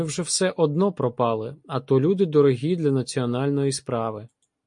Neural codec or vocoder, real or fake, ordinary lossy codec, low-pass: none; real; MP3, 48 kbps; 10.8 kHz